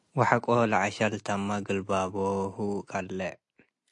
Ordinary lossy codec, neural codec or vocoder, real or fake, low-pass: AAC, 64 kbps; none; real; 10.8 kHz